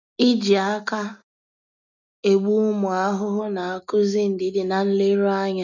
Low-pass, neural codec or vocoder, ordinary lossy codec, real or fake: 7.2 kHz; none; none; real